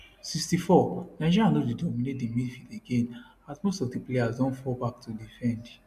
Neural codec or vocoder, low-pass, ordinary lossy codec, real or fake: none; 14.4 kHz; AAC, 96 kbps; real